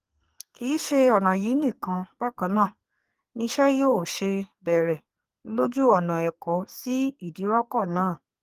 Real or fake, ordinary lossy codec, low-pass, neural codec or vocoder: fake; Opus, 24 kbps; 14.4 kHz; codec, 44.1 kHz, 2.6 kbps, SNAC